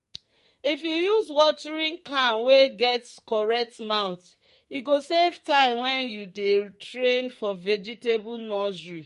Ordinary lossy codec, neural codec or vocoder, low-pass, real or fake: MP3, 48 kbps; codec, 44.1 kHz, 2.6 kbps, SNAC; 14.4 kHz; fake